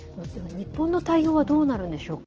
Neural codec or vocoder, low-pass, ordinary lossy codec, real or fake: none; 7.2 kHz; Opus, 16 kbps; real